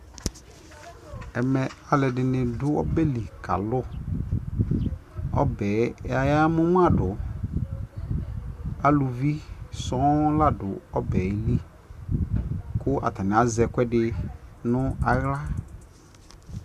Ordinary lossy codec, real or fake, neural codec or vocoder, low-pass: Opus, 64 kbps; real; none; 14.4 kHz